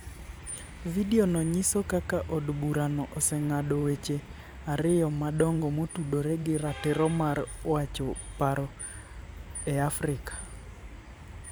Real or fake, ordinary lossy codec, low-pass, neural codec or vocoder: fake; none; none; vocoder, 44.1 kHz, 128 mel bands every 512 samples, BigVGAN v2